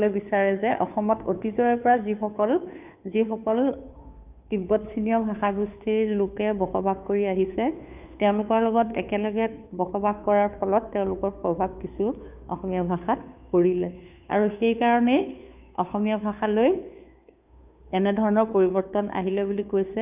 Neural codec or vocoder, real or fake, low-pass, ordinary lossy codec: codec, 16 kHz, 2 kbps, FunCodec, trained on Chinese and English, 25 frames a second; fake; 3.6 kHz; none